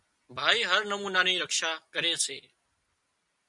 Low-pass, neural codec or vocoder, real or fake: 10.8 kHz; none; real